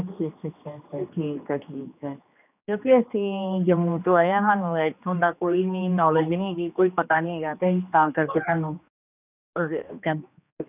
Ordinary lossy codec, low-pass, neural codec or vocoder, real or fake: none; 3.6 kHz; codec, 16 kHz, 2 kbps, X-Codec, HuBERT features, trained on general audio; fake